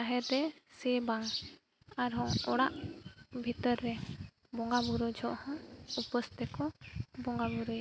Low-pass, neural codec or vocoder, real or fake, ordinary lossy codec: none; none; real; none